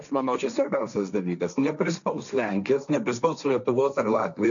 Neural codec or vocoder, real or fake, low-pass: codec, 16 kHz, 1.1 kbps, Voila-Tokenizer; fake; 7.2 kHz